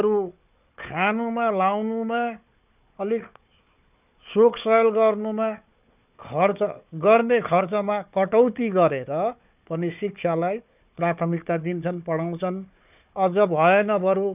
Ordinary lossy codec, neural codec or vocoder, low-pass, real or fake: none; codec, 16 kHz, 4 kbps, FunCodec, trained on Chinese and English, 50 frames a second; 3.6 kHz; fake